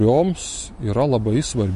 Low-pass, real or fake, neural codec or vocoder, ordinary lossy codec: 14.4 kHz; real; none; MP3, 48 kbps